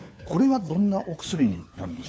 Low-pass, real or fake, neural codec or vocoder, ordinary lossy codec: none; fake; codec, 16 kHz, 4 kbps, FunCodec, trained on LibriTTS, 50 frames a second; none